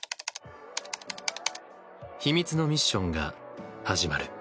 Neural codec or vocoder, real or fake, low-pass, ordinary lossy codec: none; real; none; none